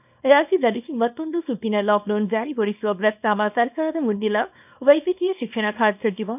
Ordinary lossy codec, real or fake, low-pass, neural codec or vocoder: none; fake; 3.6 kHz; codec, 24 kHz, 0.9 kbps, WavTokenizer, small release